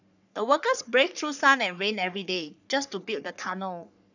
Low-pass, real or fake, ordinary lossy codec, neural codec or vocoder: 7.2 kHz; fake; none; codec, 44.1 kHz, 3.4 kbps, Pupu-Codec